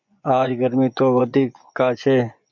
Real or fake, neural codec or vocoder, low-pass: fake; vocoder, 24 kHz, 100 mel bands, Vocos; 7.2 kHz